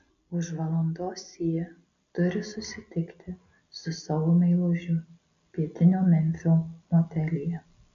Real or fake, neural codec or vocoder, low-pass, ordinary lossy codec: real; none; 7.2 kHz; AAC, 64 kbps